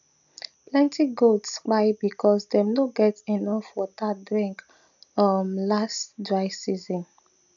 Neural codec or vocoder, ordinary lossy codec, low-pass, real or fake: none; none; 7.2 kHz; real